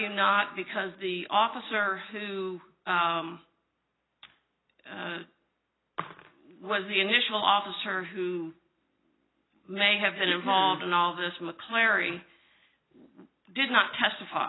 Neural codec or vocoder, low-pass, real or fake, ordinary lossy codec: none; 7.2 kHz; real; AAC, 16 kbps